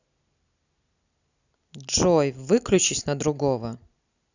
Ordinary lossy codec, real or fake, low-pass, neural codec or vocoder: none; real; 7.2 kHz; none